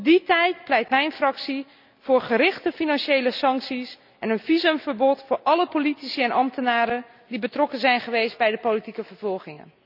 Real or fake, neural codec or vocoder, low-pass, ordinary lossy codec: real; none; 5.4 kHz; none